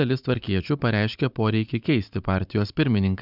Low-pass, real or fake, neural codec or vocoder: 5.4 kHz; fake; vocoder, 44.1 kHz, 128 mel bands every 512 samples, BigVGAN v2